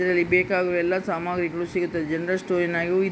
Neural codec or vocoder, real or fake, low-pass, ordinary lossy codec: none; real; none; none